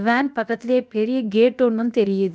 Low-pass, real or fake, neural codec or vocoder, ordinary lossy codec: none; fake; codec, 16 kHz, 0.7 kbps, FocalCodec; none